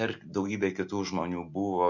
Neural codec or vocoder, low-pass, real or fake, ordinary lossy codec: none; 7.2 kHz; real; MP3, 64 kbps